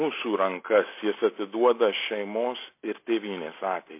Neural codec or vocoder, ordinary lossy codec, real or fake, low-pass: none; MP3, 24 kbps; real; 3.6 kHz